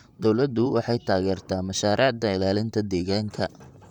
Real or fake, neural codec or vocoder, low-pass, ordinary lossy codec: fake; vocoder, 44.1 kHz, 128 mel bands, Pupu-Vocoder; 19.8 kHz; none